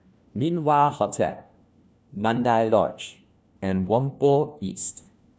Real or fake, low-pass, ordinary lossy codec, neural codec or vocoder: fake; none; none; codec, 16 kHz, 1 kbps, FunCodec, trained on LibriTTS, 50 frames a second